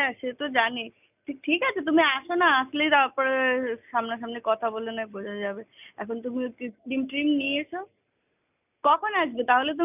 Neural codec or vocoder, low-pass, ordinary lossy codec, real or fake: none; 3.6 kHz; none; real